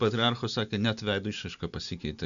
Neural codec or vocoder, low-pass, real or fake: none; 7.2 kHz; real